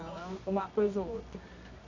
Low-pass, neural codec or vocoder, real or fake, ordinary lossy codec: 7.2 kHz; codec, 24 kHz, 0.9 kbps, WavTokenizer, medium music audio release; fake; none